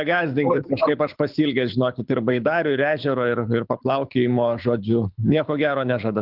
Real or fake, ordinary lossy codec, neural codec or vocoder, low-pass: fake; Opus, 24 kbps; codec, 24 kHz, 6 kbps, HILCodec; 5.4 kHz